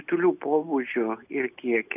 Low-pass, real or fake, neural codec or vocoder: 3.6 kHz; real; none